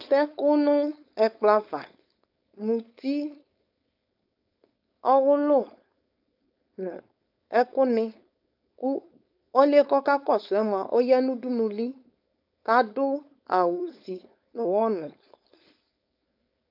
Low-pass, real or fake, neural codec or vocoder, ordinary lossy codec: 5.4 kHz; fake; codec, 16 kHz, 4.8 kbps, FACodec; AAC, 48 kbps